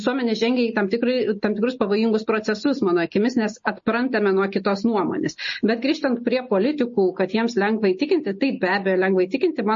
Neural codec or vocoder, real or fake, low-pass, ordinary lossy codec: none; real; 7.2 kHz; MP3, 32 kbps